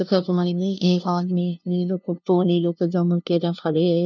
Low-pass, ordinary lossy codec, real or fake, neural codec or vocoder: 7.2 kHz; none; fake; codec, 16 kHz, 0.5 kbps, FunCodec, trained on LibriTTS, 25 frames a second